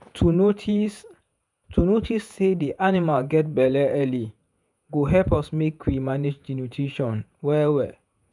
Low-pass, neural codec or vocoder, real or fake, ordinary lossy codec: 10.8 kHz; vocoder, 48 kHz, 128 mel bands, Vocos; fake; none